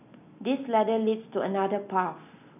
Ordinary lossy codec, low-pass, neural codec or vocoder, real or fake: none; 3.6 kHz; none; real